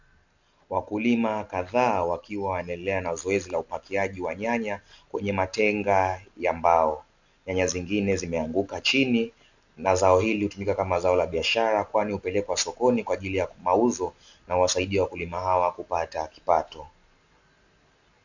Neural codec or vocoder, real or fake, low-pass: none; real; 7.2 kHz